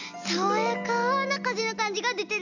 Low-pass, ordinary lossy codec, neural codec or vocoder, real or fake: 7.2 kHz; none; none; real